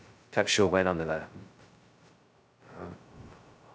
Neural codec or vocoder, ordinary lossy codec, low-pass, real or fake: codec, 16 kHz, 0.2 kbps, FocalCodec; none; none; fake